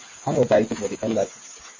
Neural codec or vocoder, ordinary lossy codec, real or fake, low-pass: codec, 16 kHz, 8 kbps, FreqCodec, smaller model; MP3, 32 kbps; fake; 7.2 kHz